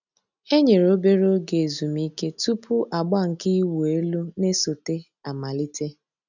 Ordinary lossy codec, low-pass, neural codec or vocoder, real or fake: none; 7.2 kHz; none; real